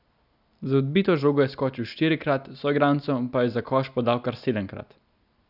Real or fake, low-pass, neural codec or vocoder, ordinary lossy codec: real; 5.4 kHz; none; none